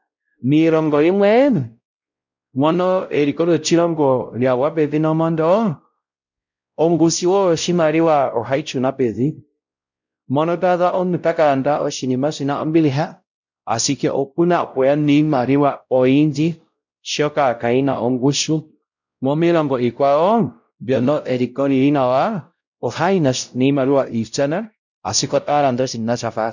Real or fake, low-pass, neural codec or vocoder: fake; 7.2 kHz; codec, 16 kHz, 0.5 kbps, X-Codec, WavLM features, trained on Multilingual LibriSpeech